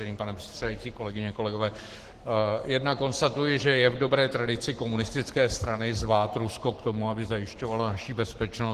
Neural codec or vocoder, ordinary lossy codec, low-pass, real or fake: codec, 44.1 kHz, 7.8 kbps, Pupu-Codec; Opus, 16 kbps; 14.4 kHz; fake